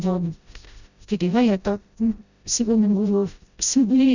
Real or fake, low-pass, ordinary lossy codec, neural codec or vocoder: fake; 7.2 kHz; none; codec, 16 kHz, 0.5 kbps, FreqCodec, smaller model